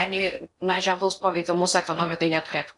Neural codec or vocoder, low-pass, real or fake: codec, 16 kHz in and 24 kHz out, 0.6 kbps, FocalCodec, streaming, 4096 codes; 10.8 kHz; fake